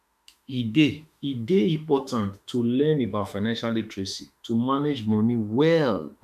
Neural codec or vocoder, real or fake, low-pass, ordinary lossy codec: autoencoder, 48 kHz, 32 numbers a frame, DAC-VAE, trained on Japanese speech; fake; 14.4 kHz; none